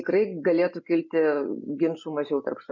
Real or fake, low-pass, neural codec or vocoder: real; 7.2 kHz; none